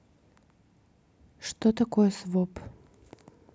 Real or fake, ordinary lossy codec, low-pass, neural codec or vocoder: real; none; none; none